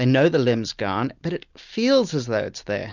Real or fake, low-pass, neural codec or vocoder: real; 7.2 kHz; none